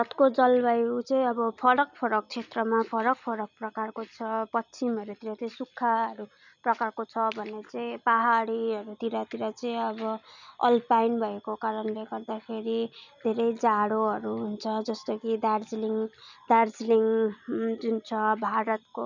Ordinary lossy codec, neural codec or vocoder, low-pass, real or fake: none; none; 7.2 kHz; real